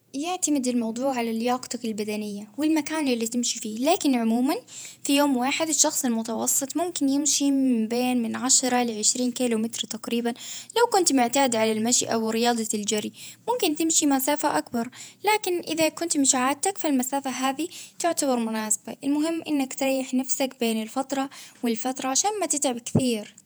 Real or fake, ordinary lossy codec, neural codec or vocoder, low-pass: fake; none; vocoder, 48 kHz, 128 mel bands, Vocos; none